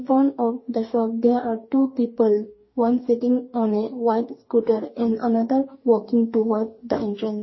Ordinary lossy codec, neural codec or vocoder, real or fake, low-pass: MP3, 24 kbps; codec, 44.1 kHz, 2.6 kbps, DAC; fake; 7.2 kHz